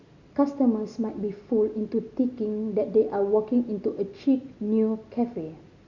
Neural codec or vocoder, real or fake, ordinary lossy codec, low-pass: none; real; Opus, 64 kbps; 7.2 kHz